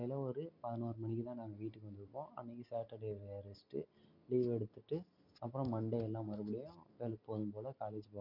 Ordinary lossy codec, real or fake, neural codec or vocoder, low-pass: MP3, 48 kbps; real; none; 5.4 kHz